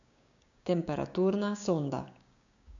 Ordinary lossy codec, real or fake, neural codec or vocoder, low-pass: none; fake; codec, 16 kHz, 6 kbps, DAC; 7.2 kHz